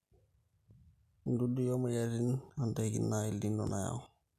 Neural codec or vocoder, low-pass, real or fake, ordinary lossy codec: none; 14.4 kHz; real; none